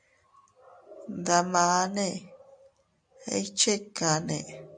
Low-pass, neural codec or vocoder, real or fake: 9.9 kHz; none; real